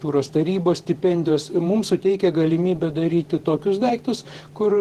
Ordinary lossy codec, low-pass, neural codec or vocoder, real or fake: Opus, 16 kbps; 14.4 kHz; vocoder, 48 kHz, 128 mel bands, Vocos; fake